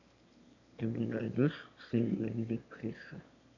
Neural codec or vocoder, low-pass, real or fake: autoencoder, 22.05 kHz, a latent of 192 numbers a frame, VITS, trained on one speaker; 7.2 kHz; fake